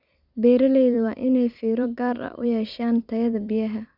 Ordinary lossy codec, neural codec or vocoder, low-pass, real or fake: none; vocoder, 44.1 kHz, 128 mel bands every 256 samples, BigVGAN v2; 5.4 kHz; fake